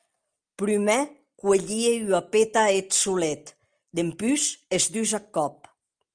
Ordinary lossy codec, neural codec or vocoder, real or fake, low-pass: Opus, 32 kbps; none; real; 9.9 kHz